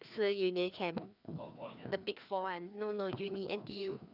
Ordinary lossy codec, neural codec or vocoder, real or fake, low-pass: none; codec, 16 kHz, 2 kbps, FreqCodec, larger model; fake; 5.4 kHz